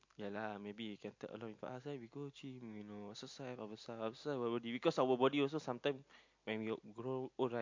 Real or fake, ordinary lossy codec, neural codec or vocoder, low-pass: real; MP3, 48 kbps; none; 7.2 kHz